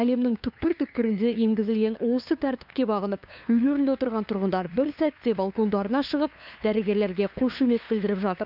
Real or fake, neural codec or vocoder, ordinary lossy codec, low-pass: fake; codec, 16 kHz, 2 kbps, FunCodec, trained on LibriTTS, 25 frames a second; none; 5.4 kHz